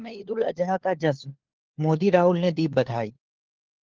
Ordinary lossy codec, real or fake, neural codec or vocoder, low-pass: Opus, 16 kbps; fake; codec, 16 kHz, 4 kbps, FunCodec, trained on LibriTTS, 50 frames a second; 7.2 kHz